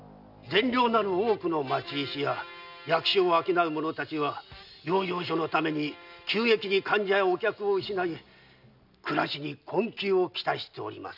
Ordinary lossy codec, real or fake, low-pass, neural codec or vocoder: none; real; 5.4 kHz; none